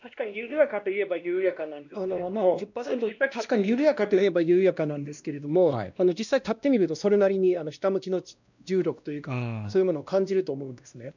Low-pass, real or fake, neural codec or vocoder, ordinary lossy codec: 7.2 kHz; fake; codec, 16 kHz, 1 kbps, X-Codec, WavLM features, trained on Multilingual LibriSpeech; none